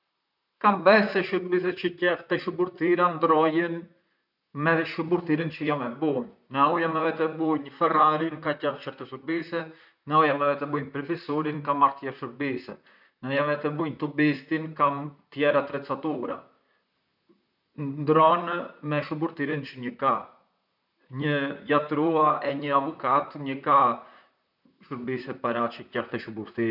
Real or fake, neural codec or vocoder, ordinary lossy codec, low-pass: fake; vocoder, 44.1 kHz, 128 mel bands, Pupu-Vocoder; none; 5.4 kHz